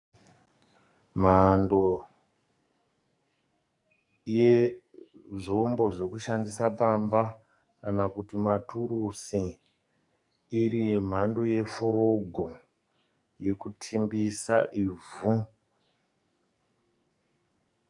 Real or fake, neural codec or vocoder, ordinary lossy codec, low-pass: fake; codec, 44.1 kHz, 2.6 kbps, SNAC; MP3, 96 kbps; 10.8 kHz